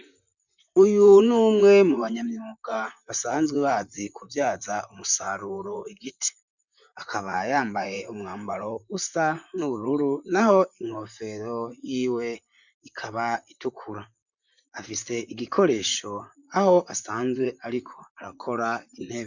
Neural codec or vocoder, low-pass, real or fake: vocoder, 44.1 kHz, 128 mel bands, Pupu-Vocoder; 7.2 kHz; fake